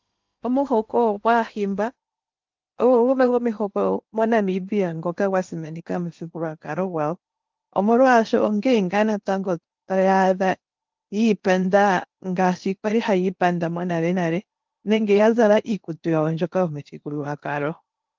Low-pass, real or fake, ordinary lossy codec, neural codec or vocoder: 7.2 kHz; fake; Opus, 32 kbps; codec, 16 kHz in and 24 kHz out, 0.8 kbps, FocalCodec, streaming, 65536 codes